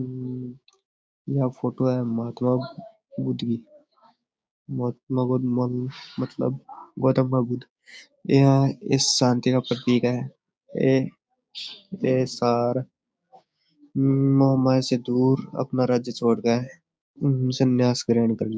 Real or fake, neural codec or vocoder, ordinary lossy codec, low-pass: fake; codec, 16 kHz, 6 kbps, DAC; none; none